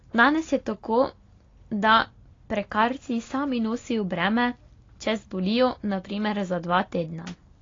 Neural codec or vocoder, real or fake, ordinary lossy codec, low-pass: none; real; AAC, 32 kbps; 7.2 kHz